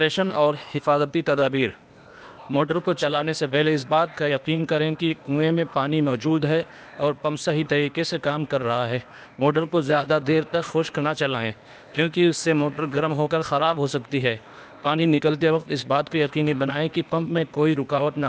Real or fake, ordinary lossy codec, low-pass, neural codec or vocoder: fake; none; none; codec, 16 kHz, 0.8 kbps, ZipCodec